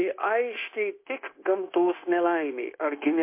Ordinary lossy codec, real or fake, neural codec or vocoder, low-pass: MP3, 24 kbps; fake; codec, 16 kHz, 0.9 kbps, LongCat-Audio-Codec; 3.6 kHz